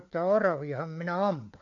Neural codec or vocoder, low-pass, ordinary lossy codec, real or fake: codec, 16 kHz, 4 kbps, FunCodec, trained on LibriTTS, 50 frames a second; 7.2 kHz; none; fake